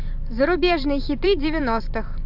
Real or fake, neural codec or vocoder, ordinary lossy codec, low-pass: fake; vocoder, 44.1 kHz, 128 mel bands every 512 samples, BigVGAN v2; AAC, 48 kbps; 5.4 kHz